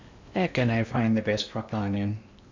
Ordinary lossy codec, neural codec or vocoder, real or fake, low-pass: MP3, 64 kbps; codec, 16 kHz in and 24 kHz out, 0.8 kbps, FocalCodec, streaming, 65536 codes; fake; 7.2 kHz